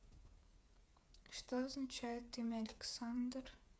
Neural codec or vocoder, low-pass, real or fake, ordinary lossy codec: codec, 16 kHz, 8 kbps, FreqCodec, smaller model; none; fake; none